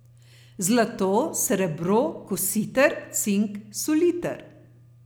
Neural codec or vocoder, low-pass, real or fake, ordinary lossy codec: none; none; real; none